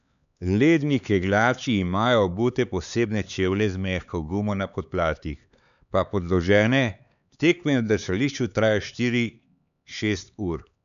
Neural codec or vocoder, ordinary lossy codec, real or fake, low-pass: codec, 16 kHz, 4 kbps, X-Codec, HuBERT features, trained on LibriSpeech; none; fake; 7.2 kHz